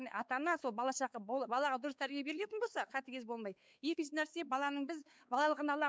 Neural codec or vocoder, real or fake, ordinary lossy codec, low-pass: codec, 16 kHz, 4 kbps, X-Codec, WavLM features, trained on Multilingual LibriSpeech; fake; none; none